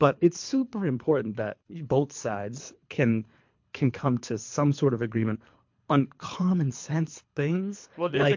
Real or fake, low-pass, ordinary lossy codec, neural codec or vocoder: fake; 7.2 kHz; MP3, 48 kbps; codec, 24 kHz, 3 kbps, HILCodec